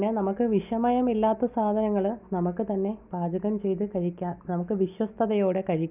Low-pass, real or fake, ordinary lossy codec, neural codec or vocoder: 3.6 kHz; real; none; none